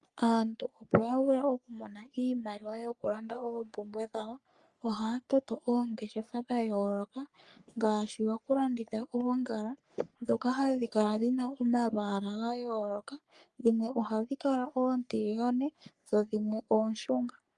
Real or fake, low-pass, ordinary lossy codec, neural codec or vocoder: fake; 10.8 kHz; Opus, 32 kbps; codec, 44.1 kHz, 3.4 kbps, Pupu-Codec